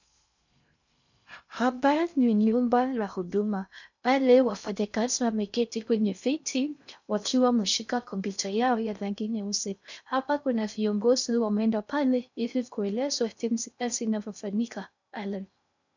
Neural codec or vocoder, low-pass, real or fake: codec, 16 kHz in and 24 kHz out, 0.6 kbps, FocalCodec, streaming, 2048 codes; 7.2 kHz; fake